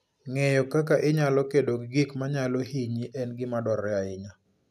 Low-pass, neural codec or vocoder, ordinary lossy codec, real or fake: 14.4 kHz; none; none; real